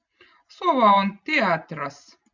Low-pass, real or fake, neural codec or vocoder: 7.2 kHz; real; none